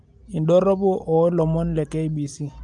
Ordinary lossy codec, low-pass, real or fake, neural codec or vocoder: Opus, 32 kbps; 10.8 kHz; real; none